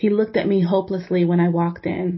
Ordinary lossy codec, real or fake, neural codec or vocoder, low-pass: MP3, 24 kbps; real; none; 7.2 kHz